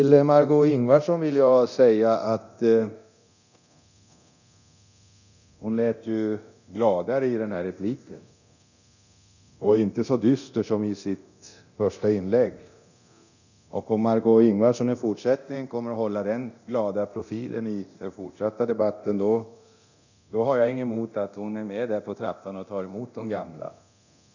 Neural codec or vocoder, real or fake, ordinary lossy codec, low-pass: codec, 24 kHz, 0.9 kbps, DualCodec; fake; none; 7.2 kHz